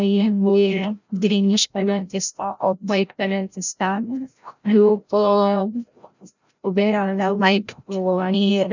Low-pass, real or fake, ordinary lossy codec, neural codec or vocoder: 7.2 kHz; fake; none; codec, 16 kHz, 0.5 kbps, FreqCodec, larger model